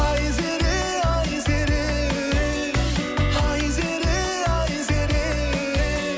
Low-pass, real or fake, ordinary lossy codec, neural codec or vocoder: none; real; none; none